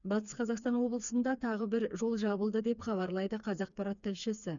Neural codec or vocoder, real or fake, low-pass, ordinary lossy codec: codec, 16 kHz, 4 kbps, FreqCodec, smaller model; fake; 7.2 kHz; none